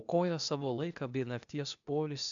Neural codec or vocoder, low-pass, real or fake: codec, 16 kHz, 0.8 kbps, ZipCodec; 7.2 kHz; fake